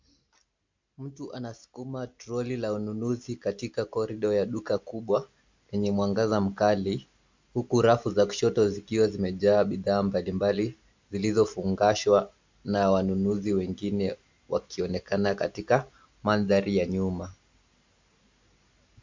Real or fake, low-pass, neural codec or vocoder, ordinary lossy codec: real; 7.2 kHz; none; MP3, 64 kbps